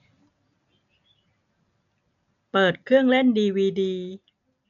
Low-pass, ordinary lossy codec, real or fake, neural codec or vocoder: 7.2 kHz; none; real; none